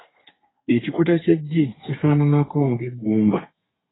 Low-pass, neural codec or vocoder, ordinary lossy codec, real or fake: 7.2 kHz; codec, 32 kHz, 1.9 kbps, SNAC; AAC, 16 kbps; fake